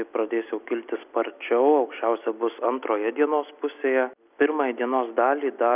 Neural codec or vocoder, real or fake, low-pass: none; real; 3.6 kHz